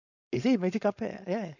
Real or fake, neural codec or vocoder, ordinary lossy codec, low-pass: fake; codec, 16 kHz, 4.8 kbps, FACodec; none; 7.2 kHz